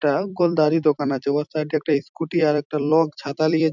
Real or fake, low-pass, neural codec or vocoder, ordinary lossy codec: fake; 7.2 kHz; vocoder, 44.1 kHz, 128 mel bands every 256 samples, BigVGAN v2; none